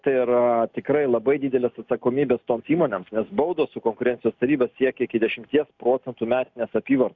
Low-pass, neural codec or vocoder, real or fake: 7.2 kHz; none; real